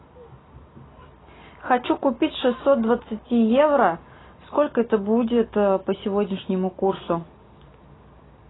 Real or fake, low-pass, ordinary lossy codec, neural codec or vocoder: real; 7.2 kHz; AAC, 16 kbps; none